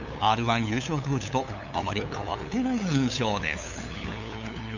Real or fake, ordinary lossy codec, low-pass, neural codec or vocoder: fake; none; 7.2 kHz; codec, 16 kHz, 8 kbps, FunCodec, trained on LibriTTS, 25 frames a second